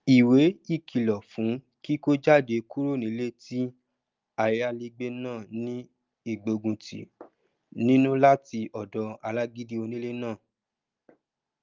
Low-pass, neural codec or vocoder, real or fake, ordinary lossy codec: 7.2 kHz; none; real; Opus, 32 kbps